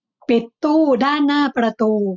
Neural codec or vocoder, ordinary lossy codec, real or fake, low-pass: none; none; real; 7.2 kHz